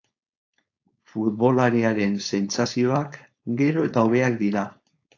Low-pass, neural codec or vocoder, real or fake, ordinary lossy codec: 7.2 kHz; codec, 16 kHz, 4.8 kbps, FACodec; fake; AAC, 48 kbps